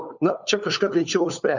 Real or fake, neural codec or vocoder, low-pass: fake; codec, 16 kHz, 4 kbps, FunCodec, trained on LibriTTS, 50 frames a second; 7.2 kHz